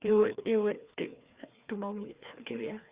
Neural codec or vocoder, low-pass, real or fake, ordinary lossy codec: codec, 16 kHz, 2 kbps, FreqCodec, larger model; 3.6 kHz; fake; Opus, 64 kbps